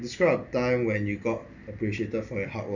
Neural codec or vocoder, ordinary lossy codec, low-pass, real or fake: none; none; 7.2 kHz; real